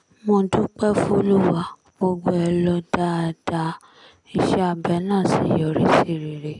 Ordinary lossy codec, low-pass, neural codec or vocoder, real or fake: none; 10.8 kHz; none; real